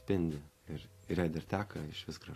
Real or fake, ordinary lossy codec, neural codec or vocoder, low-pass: real; AAC, 48 kbps; none; 14.4 kHz